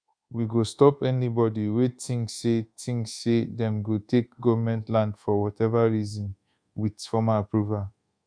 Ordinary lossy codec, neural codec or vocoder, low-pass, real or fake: Opus, 64 kbps; codec, 24 kHz, 1.2 kbps, DualCodec; 9.9 kHz; fake